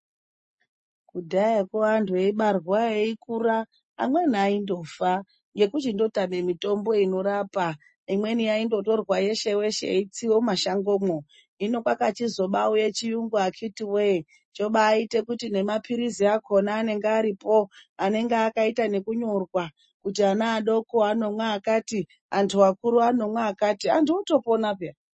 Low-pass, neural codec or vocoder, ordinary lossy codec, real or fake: 9.9 kHz; none; MP3, 32 kbps; real